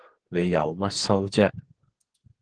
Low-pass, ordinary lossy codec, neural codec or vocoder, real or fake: 9.9 kHz; Opus, 16 kbps; codec, 44.1 kHz, 2.6 kbps, SNAC; fake